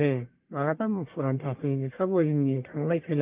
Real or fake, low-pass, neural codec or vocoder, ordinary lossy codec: fake; 3.6 kHz; codec, 44.1 kHz, 1.7 kbps, Pupu-Codec; Opus, 32 kbps